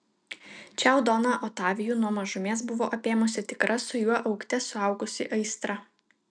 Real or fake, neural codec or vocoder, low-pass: real; none; 9.9 kHz